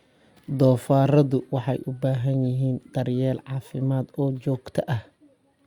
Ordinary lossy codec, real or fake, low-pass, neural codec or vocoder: Opus, 64 kbps; real; 19.8 kHz; none